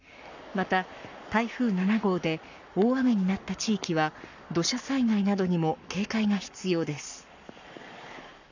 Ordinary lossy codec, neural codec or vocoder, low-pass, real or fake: none; codec, 44.1 kHz, 7.8 kbps, Pupu-Codec; 7.2 kHz; fake